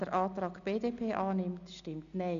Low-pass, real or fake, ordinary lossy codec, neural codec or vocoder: 7.2 kHz; real; none; none